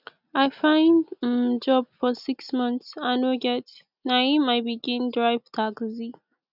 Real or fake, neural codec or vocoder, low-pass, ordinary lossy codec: real; none; 5.4 kHz; none